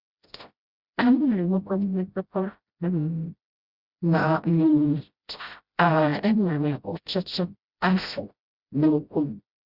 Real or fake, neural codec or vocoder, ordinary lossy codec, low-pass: fake; codec, 16 kHz, 0.5 kbps, FreqCodec, smaller model; Opus, 64 kbps; 5.4 kHz